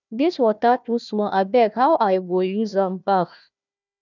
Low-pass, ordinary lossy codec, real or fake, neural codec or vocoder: 7.2 kHz; none; fake; codec, 16 kHz, 1 kbps, FunCodec, trained on Chinese and English, 50 frames a second